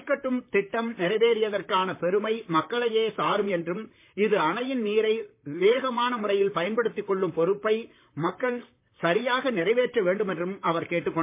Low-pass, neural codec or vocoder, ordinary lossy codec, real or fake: 3.6 kHz; vocoder, 44.1 kHz, 128 mel bands, Pupu-Vocoder; MP3, 24 kbps; fake